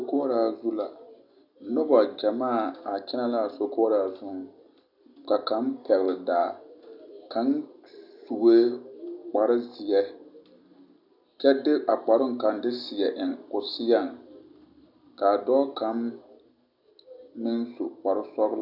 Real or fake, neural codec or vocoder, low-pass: real; none; 5.4 kHz